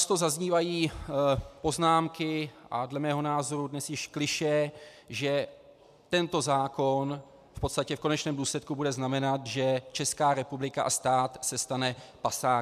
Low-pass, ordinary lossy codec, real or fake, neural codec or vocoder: 14.4 kHz; MP3, 96 kbps; real; none